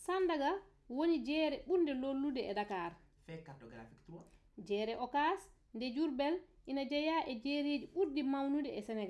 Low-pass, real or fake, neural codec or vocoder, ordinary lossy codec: none; real; none; none